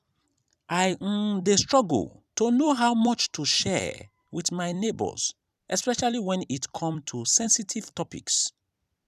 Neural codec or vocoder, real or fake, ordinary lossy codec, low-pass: vocoder, 44.1 kHz, 128 mel bands every 256 samples, BigVGAN v2; fake; none; 14.4 kHz